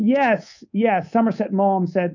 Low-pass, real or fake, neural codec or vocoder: 7.2 kHz; fake; codec, 24 kHz, 3.1 kbps, DualCodec